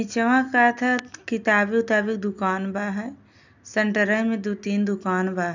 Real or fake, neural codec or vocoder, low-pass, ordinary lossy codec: real; none; 7.2 kHz; none